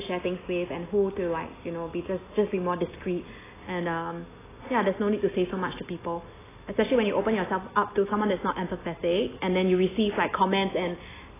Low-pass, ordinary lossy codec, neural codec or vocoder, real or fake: 3.6 kHz; AAC, 16 kbps; none; real